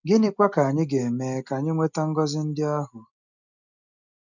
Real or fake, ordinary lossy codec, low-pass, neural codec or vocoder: real; none; 7.2 kHz; none